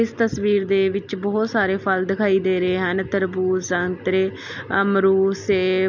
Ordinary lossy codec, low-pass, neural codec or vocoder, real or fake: none; 7.2 kHz; none; real